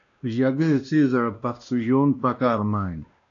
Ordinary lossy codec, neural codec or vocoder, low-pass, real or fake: AAC, 48 kbps; codec, 16 kHz, 1 kbps, X-Codec, WavLM features, trained on Multilingual LibriSpeech; 7.2 kHz; fake